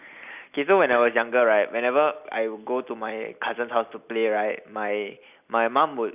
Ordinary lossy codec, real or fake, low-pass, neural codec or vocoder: none; real; 3.6 kHz; none